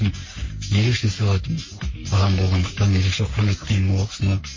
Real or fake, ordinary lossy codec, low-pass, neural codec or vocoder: fake; MP3, 32 kbps; 7.2 kHz; codec, 44.1 kHz, 3.4 kbps, Pupu-Codec